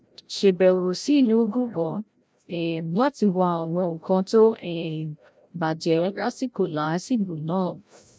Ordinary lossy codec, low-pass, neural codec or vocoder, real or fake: none; none; codec, 16 kHz, 0.5 kbps, FreqCodec, larger model; fake